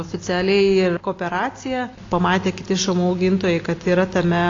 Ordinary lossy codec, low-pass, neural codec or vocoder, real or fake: AAC, 32 kbps; 7.2 kHz; none; real